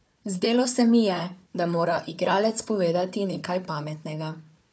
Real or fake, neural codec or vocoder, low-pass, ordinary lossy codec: fake; codec, 16 kHz, 4 kbps, FunCodec, trained on Chinese and English, 50 frames a second; none; none